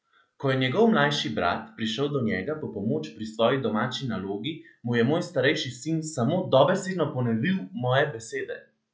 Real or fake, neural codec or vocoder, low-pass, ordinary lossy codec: real; none; none; none